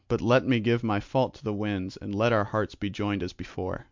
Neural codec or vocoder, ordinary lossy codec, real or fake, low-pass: none; MP3, 64 kbps; real; 7.2 kHz